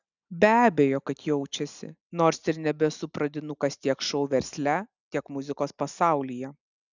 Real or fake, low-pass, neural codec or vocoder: real; 7.2 kHz; none